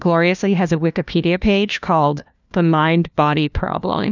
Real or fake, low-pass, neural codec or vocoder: fake; 7.2 kHz; codec, 16 kHz, 1 kbps, FunCodec, trained on LibriTTS, 50 frames a second